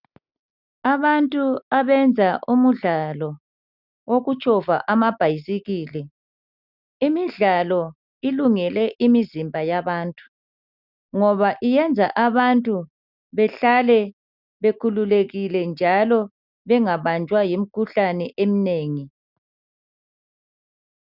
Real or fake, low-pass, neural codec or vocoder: real; 5.4 kHz; none